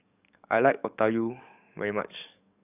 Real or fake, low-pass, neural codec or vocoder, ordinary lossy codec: fake; 3.6 kHz; codec, 44.1 kHz, 7.8 kbps, DAC; none